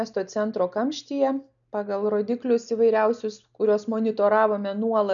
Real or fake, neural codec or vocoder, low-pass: real; none; 7.2 kHz